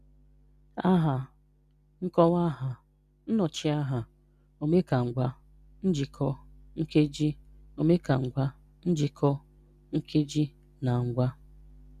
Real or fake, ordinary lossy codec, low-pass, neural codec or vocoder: real; none; 14.4 kHz; none